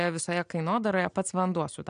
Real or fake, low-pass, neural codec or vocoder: real; 9.9 kHz; none